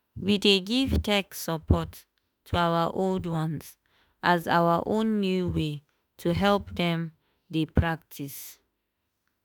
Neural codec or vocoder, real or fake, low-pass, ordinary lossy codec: autoencoder, 48 kHz, 32 numbers a frame, DAC-VAE, trained on Japanese speech; fake; none; none